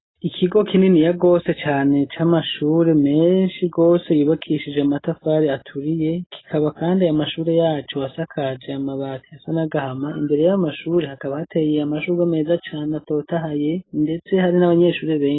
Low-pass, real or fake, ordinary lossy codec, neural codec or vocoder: 7.2 kHz; real; AAC, 16 kbps; none